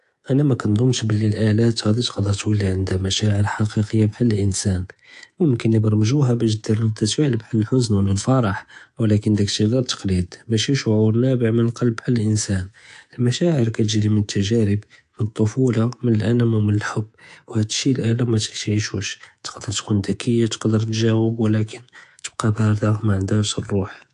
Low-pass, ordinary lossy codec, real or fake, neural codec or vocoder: 10.8 kHz; AAC, 64 kbps; fake; codec, 24 kHz, 3.1 kbps, DualCodec